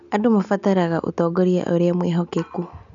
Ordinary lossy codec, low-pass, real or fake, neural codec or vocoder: none; 7.2 kHz; real; none